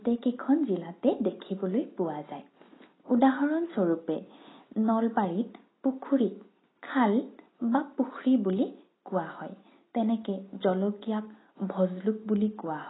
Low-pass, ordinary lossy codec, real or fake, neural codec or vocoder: 7.2 kHz; AAC, 16 kbps; real; none